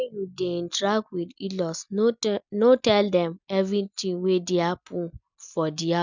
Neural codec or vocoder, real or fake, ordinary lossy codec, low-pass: none; real; none; 7.2 kHz